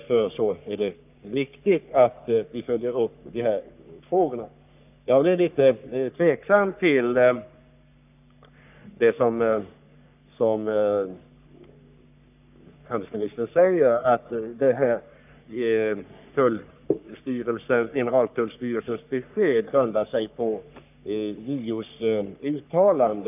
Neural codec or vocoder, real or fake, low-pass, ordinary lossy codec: codec, 44.1 kHz, 3.4 kbps, Pupu-Codec; fake; 3.6 kHz; none